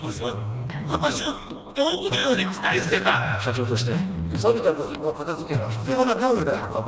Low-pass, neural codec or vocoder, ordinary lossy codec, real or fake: none; codec, 16 kHz, 1 kbps, FreqCodec, smaller model; none; fake